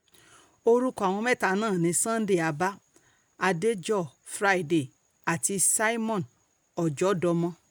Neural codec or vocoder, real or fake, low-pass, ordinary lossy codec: none; real; none; none